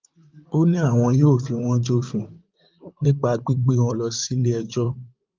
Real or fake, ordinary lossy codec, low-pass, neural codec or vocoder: fake; Opus, 24 kbps; 7.2 kHz; vocoder, 44.1 kHz, 128 mel bands, Pupu-Vocoder